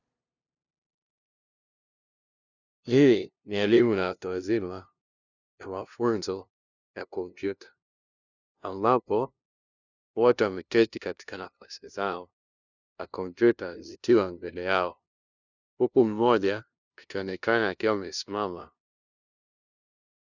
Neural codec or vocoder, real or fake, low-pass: codec, 16 kHz, 0.5 kbps, FunCodec, trained on LibriTTS, 25 frames a second; fake; 7.2 kHz